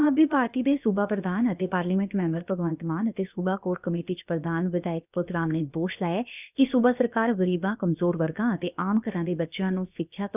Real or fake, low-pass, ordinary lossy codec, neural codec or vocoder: fake; 3.6 kHz; none; codec, 16 kHz, about 1 kbps, DyCAST, with the encoder's durations